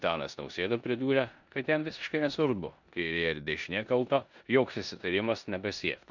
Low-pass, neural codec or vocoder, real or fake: 7.2 kHz; codec, 16 kHz in and 24 kHz out, 0.9 kbps, LongCat-Audio-Codec, four codebook decoder; fake